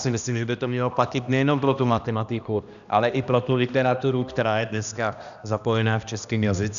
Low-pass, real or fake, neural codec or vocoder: 7.2 kHz; fake; codec, 16 kHz, 1 kbps, X-Codec, HuBERT features, trained on balanced general audio